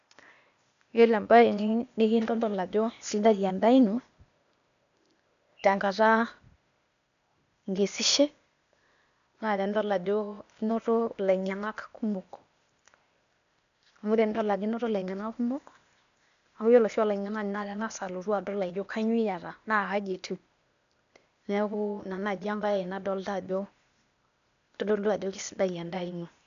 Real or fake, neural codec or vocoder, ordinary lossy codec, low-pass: fake; codec, 16 kHz, 0.8 kbps, ZipCodec; none; 7.2 kHz